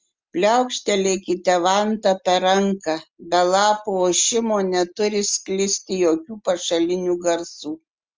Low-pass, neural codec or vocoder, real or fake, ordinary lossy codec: 7.2 kHz; none; real; Opus, 32 kbps